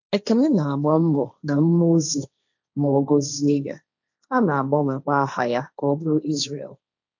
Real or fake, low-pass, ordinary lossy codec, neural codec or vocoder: fake; 7.2 kHz; none; codec, 16 kHz, 1.1 kbps, Voila-Tokenizer